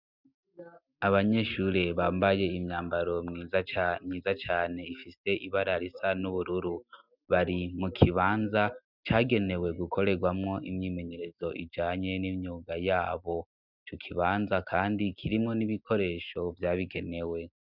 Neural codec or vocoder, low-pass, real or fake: none; 5.4 kHz; real